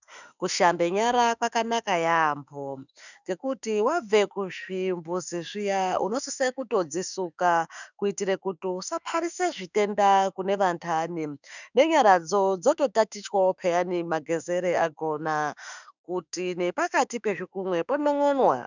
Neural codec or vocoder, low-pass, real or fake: autoencoder, 48 kHz, 32 numbers a frame, DAC-VAE, trained on Japanese speech; 7.2 kHz; fake